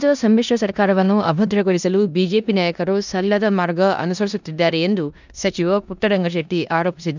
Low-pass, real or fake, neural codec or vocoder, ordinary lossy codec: 7.2 kHz; fake; codec, 16 kHz in and 24 kHz out, 0.9 kbps, LongCat-Audio-Codec, four codebook decoder; none